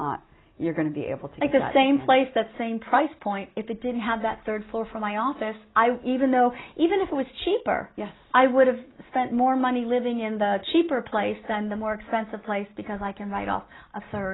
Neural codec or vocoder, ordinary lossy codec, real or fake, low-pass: none; AAC, 16 kbps; real; 7.2 kHz